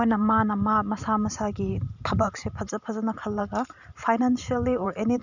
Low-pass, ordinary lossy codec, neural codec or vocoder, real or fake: 7.2 kHz; none; vocoder, 44.1 kHz, 128 mel bands every 512 samples, BigVGAN v2; fake